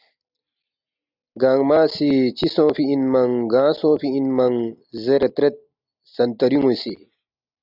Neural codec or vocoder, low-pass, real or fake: none; 5.4 kHz; real